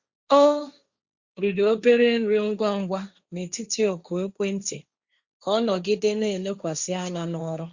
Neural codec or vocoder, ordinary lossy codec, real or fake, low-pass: codec, 16 kHz, 1.1 kbps, Voila-Tokenizer; Opus, 64 kbps; fake; 7.2 kHz